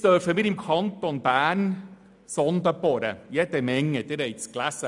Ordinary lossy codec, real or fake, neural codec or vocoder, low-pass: none; real; none; 10.8 kHz